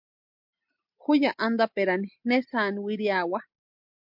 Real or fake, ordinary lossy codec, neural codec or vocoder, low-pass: real; MP3, 48 kbps; none; 5.4 kHz